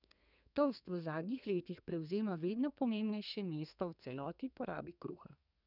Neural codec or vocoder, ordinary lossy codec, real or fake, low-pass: codec, 32 kHz, 1.9 kbps, SNAC; none; fake; 5.4 kHz